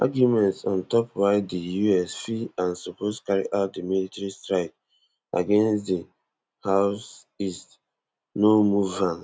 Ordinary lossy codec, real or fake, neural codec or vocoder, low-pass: none; real; none; none